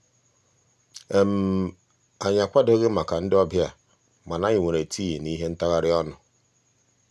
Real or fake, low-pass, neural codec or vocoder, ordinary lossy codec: real; none; none; none